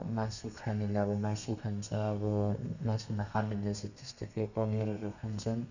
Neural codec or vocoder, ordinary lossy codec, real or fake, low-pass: codec, 32 kHz, 1.9 kbps, SNAC; none; fake; 7.2 kHz